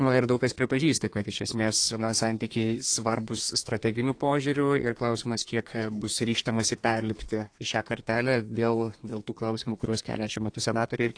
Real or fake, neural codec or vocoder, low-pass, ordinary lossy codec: fake; codec, 32 kHz, 1.9 kbps, SNAC; 9.9 kHz; AAC, 48 kbps